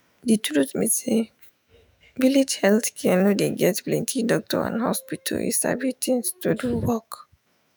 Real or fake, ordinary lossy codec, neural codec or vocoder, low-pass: fake; none; autoencoder, 48 kHz, 128 numbers a frame, DAC-VAE, trained on Japanese speech; none